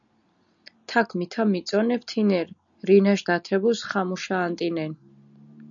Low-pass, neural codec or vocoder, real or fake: 7.2 kHz; none; real